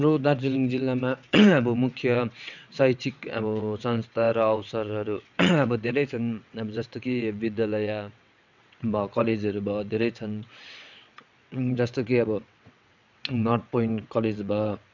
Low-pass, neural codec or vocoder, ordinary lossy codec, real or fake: 7.2 kHz; vocoder, 22.05 kHz, 80 mel bands, WaveNeXt; none; fake